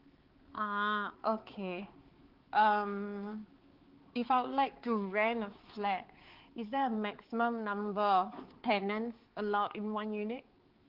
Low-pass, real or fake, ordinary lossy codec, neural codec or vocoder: 5.4 kHz; fake; Opus, 16 kbps; codec, 16 kHz, 4 kbps, X-Codec, HuBERT features, trained on balanced general audio